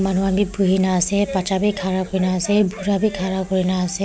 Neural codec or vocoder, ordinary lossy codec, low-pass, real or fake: none; none; none; real